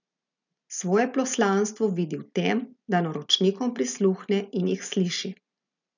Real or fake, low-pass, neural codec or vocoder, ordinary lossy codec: real; 7.2 kHz; none; none